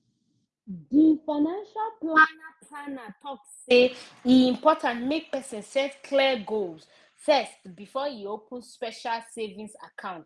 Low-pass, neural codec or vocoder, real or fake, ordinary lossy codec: none; none; real; none